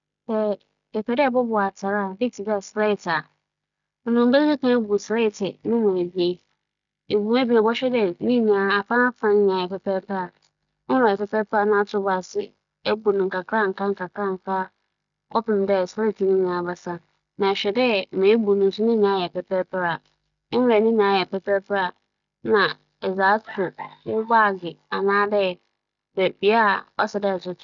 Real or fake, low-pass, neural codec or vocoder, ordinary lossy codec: real; 7.2 kHz; none; none